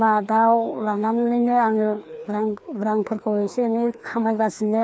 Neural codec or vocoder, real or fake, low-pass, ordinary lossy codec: codec, 16 kHz, 2 kbps, FreqCodec, larger model; fake; none; none